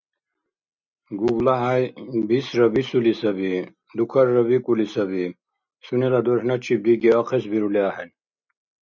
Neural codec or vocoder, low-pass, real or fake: none; 7.2 kHz; real